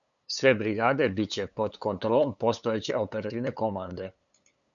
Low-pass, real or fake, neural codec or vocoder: 7.2 kHz; fake; codec, 16 kHz, 8 kbps, FunCodec, trained on LibriTTS, 25 frames a second